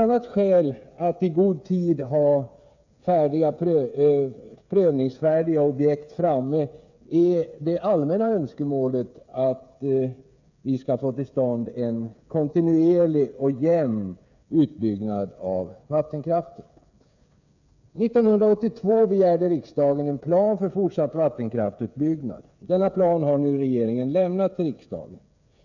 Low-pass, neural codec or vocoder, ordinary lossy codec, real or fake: 7.2 kHz; codec, 16 kHz, 8 kbps, FreqCodec, smaller model; none; fake